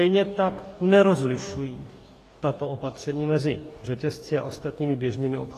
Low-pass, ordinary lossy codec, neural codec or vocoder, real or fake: 14.4 kHz; AAC, 48 kbps; codec, 44.1 kHz, 2.6 kbps, DAC; fake